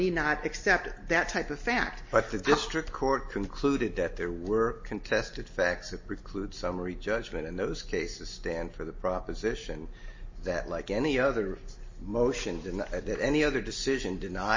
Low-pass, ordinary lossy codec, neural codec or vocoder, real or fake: 7.2 kHz; MP3, 32 kbps; none; real